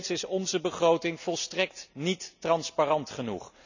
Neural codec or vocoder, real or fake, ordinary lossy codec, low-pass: none; real; none; 7.2 kHz